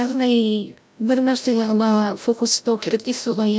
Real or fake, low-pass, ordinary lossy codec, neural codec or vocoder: fake; none; none; codec, 16 kHz, 0.5 kbps, FreqCodec, larger model